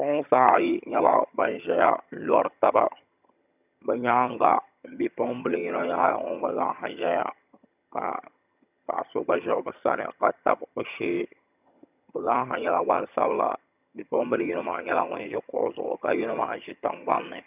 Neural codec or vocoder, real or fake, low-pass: vocoder, 22.05 kHz, 80 mel bands, HiFi-GAN; fake; 3.6 kHz